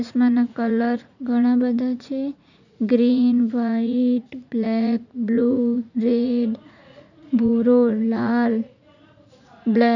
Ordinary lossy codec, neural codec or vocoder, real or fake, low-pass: none; vocoder, 44.1 kHz, 80 mel bands, Vocos; fake; 7.2 kHz